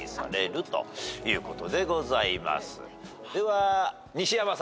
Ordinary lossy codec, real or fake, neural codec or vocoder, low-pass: none; real; none; none